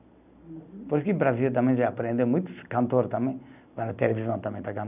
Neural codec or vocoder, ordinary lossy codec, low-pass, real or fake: none; none; 3.6 kHz; real